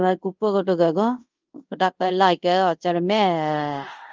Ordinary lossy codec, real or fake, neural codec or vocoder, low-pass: Opus, 24 kbps; fake; codec, 24 kHz, 0.5 kbps, DualCodec; 7.2 kHz